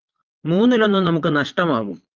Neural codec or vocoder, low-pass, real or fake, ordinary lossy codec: vocoder, 22.05 kHz, 80 mel bands, Vocos; 7.2 kHz; fake; Opus, 32 kbps